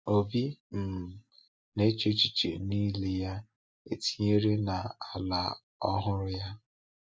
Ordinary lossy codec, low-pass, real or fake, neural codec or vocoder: none; none; real; none